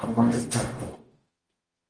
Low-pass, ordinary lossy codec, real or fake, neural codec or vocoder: 9.9 kHz; Opus, 24 kbps; fake; codec, 44.1 kHz, 0.9 kbps, DAC